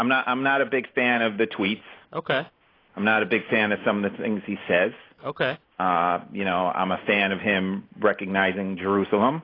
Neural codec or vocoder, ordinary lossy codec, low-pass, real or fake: none; AAC, 24 kbps; 5.4 kHz; real